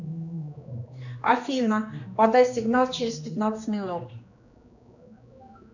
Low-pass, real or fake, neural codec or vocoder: 7.2 kHz; fake; codec, 16 kHz, 2 kbps, X-Codec, HuBERT features, trained on general audio